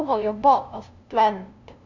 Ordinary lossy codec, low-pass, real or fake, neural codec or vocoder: none; 7.2 kHz; fake; codec, 16 kHz, 0.5 kbps, FunCodec, trained on LibriTTS, 25 frames a second